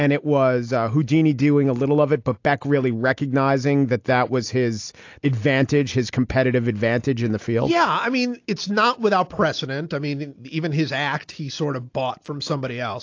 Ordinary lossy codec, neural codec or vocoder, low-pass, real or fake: AAC, 48 kbps; none; 7.2 kHz; real